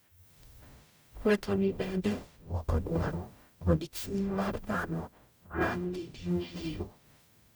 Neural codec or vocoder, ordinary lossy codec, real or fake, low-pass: codec, 44.1 kHz, 0.9 kbps, DAC; none; fake; none